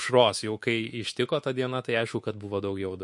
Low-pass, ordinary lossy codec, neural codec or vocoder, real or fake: 10.8 kHz; MP3, 48 kbps; codec, 24 kHz, 3.1 kbps, DualCodec; fake